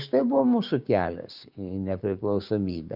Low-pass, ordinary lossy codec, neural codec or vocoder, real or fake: 5.4 kHz; Opus, 64 kbps; codec, 16 kHz, 6 kbps, DAC; fake